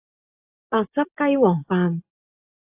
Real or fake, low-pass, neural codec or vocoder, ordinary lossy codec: real; 3.6 kHz; none; AAC, 32 kbps